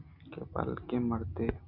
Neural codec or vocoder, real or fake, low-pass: none; real; 5.4 kHz